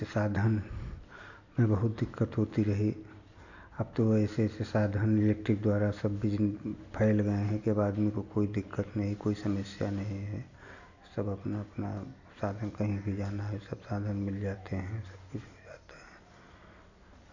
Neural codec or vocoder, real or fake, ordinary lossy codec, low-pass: none; real; none; 7.2 kHz